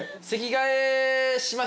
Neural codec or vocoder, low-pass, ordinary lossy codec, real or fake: none; none; none; real